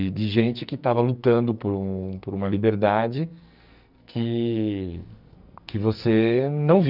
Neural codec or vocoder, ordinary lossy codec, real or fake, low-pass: codec, 44.1 kHz, 2.6 kbps, SNAC; none; fake; 5.4 kHz